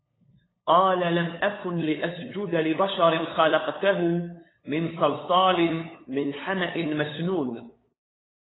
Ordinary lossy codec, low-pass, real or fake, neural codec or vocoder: AAC, 16 kbps; 7.2 kHz; fake; codec, 16 kHz, 8 kbps, FunCodec, trained on LibriTTS, 25 frames a second